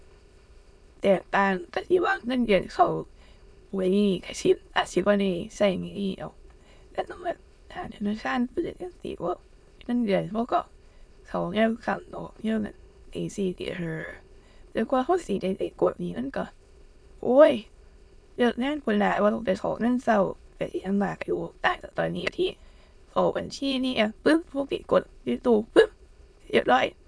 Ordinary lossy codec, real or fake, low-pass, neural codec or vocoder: none; fake; none; autoencoder, 22.05 kHz, a latent of 192 numbers a frame, VITS, trained on many speakers